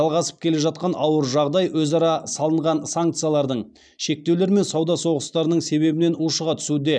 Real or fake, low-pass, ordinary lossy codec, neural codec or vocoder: real; 9.9 kHz; none; none